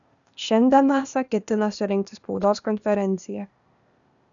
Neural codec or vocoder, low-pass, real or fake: codec, 16 kHz, 0.8 kbps, ZipCodec; 7.2 kHz; fake